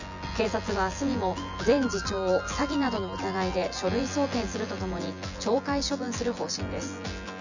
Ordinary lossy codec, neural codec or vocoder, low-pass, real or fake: none; vocoder, 24 kHz, 100 mel bands, Vocos; 7.2 kHz; fake